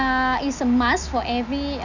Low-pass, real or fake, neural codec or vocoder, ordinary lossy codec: 7.2 kHz; real; none; none